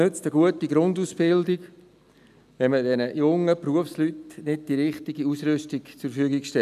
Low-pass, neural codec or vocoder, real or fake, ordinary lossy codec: 14.4 kHz; none; real; none